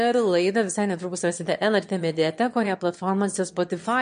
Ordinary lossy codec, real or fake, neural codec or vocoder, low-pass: MP3, 48 kbps; fake; autoencoder, 22.05 kHz, a latent of 192 numbers a frame, VITS, trained on one speaker; 9.9 kHz